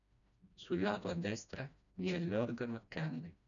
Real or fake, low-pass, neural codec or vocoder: fake; 7.2 kHz; codec, 16 kHz, 1 kbps, FreqCodec, smaller model